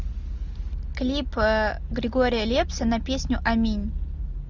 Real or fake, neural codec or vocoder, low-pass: real; none; 7.2 kHz